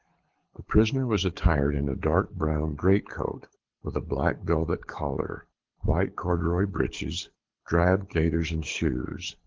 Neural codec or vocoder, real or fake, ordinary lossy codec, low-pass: codec, 24 kHz, 6 kbps, HILCodec; fake; Opus, 16 kbps; 7.2 kHz